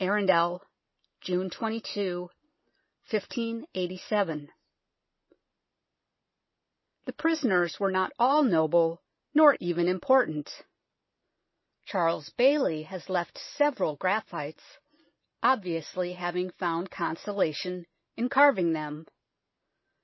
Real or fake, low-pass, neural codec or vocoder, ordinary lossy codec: real; 7.2 kHz; none; MP3, 24 kbps